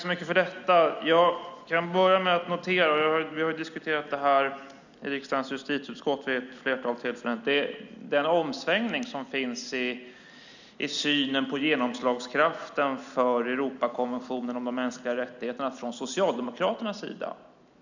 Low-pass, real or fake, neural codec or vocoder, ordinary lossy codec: 7.2 kHz; real; none; none